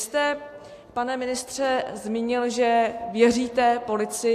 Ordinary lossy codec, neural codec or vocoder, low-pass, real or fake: AAC, 64 kbps; none; 14.4 kHz; real